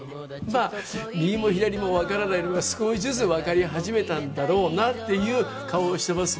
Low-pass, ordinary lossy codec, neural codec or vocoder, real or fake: none; none; none; real